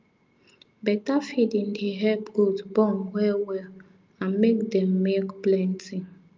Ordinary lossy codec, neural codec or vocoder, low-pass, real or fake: Opus, 24 kbps; none; 7.2 kHz; real